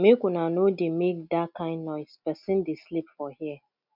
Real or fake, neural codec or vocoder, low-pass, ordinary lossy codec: real; none; 5.4 kHz; none